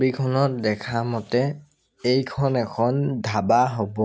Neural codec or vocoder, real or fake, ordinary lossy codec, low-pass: none; real; none; none